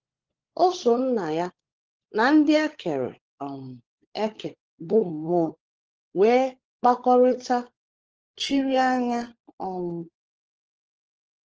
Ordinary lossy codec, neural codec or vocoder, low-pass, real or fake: Opus, 16 kbps; codec, 16 kHz, 16 kbps, FunCodec, trained on LibriTTS, 50 frames a second; 7.2 kHz; fake